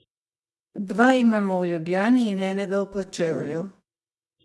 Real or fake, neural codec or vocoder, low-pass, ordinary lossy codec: fake; codec, 24 kHz, 0.9 kbps, WavTokenizer, medium music audio release; none; none